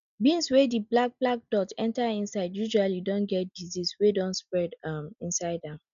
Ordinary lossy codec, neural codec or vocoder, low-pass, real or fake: none; none; 7.2 kHz; real